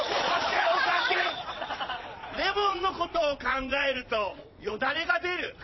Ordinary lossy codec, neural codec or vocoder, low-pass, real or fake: MP3, 24 kbps; vocoder, 22.05 kHz, 80 mel bands, Vocos; 7.2 kHz; fake